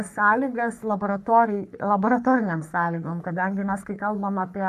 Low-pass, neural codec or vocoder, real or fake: 14.4 kHz; codec, 44.1 kHz, 3.4 kbps, Pupu-Codec; fake